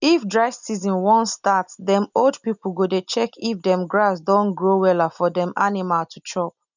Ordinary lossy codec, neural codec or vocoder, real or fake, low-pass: none; none; real; 7.2 kHz